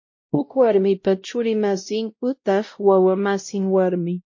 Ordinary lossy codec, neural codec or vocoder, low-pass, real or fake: MP3, 32 kbps; codec, 16 kHz, 0.5 kbps, X-Codec, WavLM features, trained on Multilingual LibriSpeech; 7.2 kHz; fake